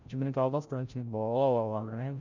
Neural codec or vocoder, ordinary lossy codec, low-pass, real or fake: codec, 16 kHz, 0.5 kbps, FreqCodec, larger model; none; 7.2 kHz; fake